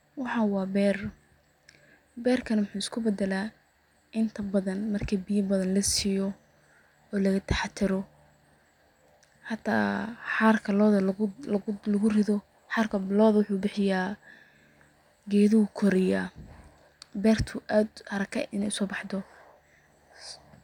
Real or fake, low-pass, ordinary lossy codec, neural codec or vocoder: real; 19.8 kHz; none; none